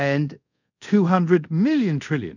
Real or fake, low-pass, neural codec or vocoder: fake; 7.2 kHz; codec, 24 kHz, 0.5 kbps, DualCodec